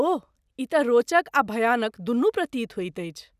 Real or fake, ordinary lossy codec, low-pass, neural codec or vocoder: real; none; 14.4 kHz; none